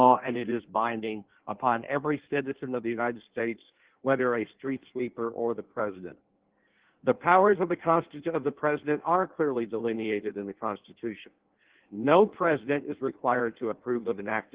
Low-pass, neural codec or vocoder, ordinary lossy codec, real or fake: 3.6 kHz; codec, 16 kHz in and 24 kHz out, 1.1 kbps, FireRedTTS-2 codec; Opus, 16 kbps; fake